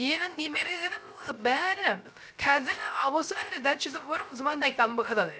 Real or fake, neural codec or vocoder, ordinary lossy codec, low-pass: fake; codec, 16 kHz, 0.3 kbps, FocalCodec; none; none